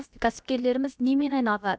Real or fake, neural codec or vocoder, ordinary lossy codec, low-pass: fake; codec, 16 kHz, about 1 kbps, DyCAST, with the encoder's durations; none; none